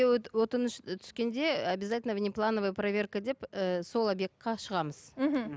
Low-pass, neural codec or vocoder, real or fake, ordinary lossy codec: none; none; real; none